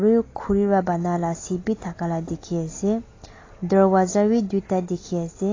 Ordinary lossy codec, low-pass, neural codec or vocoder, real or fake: AAC, 32 kbps; 7.2 kHz; none; real